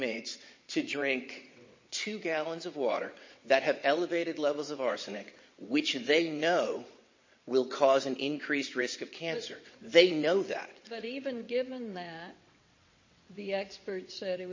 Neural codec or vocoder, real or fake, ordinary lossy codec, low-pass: vocoder, 22.05 kHz, 80 mel bands, WaveNeXt; fake; MP3, 32 kbps; 7.2 kHz